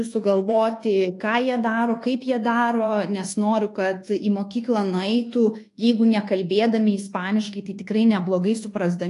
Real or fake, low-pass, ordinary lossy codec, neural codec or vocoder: fake; 10.8 kHz; AAC, 48 kbps; codec, 24 kHz, 1.2 kbps, DualCodec